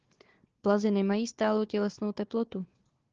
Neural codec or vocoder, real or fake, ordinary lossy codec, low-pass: codec, 16 kHz, 4 kbps, FunCodec, trained on Chinese and English, 50 frames a second; fake; Opus, 16 kbps; 7.2 kHz